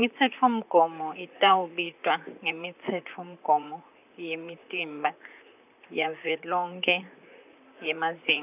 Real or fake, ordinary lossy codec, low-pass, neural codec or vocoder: fake; none; 3.6 kHz; vocoder, 44.1 kHz, 128 mel bands, Pupu-Vocoder